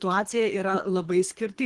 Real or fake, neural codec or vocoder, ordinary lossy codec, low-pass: fake; codec, 24 kHz, 3 kbps, HILCodec; Opus, 24 kbps; 10.8 kHz